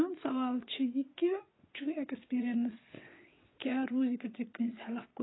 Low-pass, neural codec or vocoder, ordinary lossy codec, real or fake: 7.2 kHz; vocoder, 22.05 kHz, 80 mel bands, WaveNeXt; AAC, 16 kbps; fake